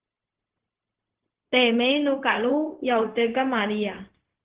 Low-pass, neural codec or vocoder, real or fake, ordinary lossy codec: 3.6 kHz; codec, 16 kHz, 0.4 kbps, LongCat-Audio-Codec; fake; Opus, 16 kbps